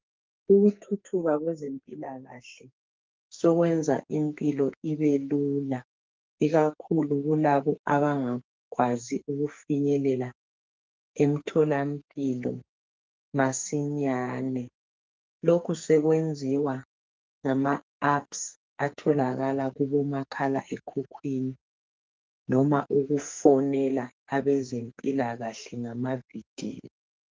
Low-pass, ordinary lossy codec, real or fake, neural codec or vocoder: 7.2 kHz; Opus, 32 kbps; fake; codec, 44.1 kHz, 2.6 kbps, SNAC